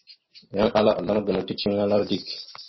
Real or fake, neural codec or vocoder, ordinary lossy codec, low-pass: real; none; MP3, 24 kbps; 7.2 kHz